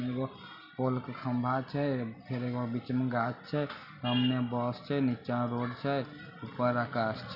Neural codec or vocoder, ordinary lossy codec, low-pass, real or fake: none; none; 5.4 kHz; real